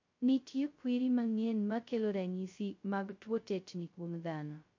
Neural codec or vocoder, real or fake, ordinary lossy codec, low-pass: codec, 16 kHz, 0.2 kbps, FocalCodec; fake; MP3, 48 kbps; 7.2 kHz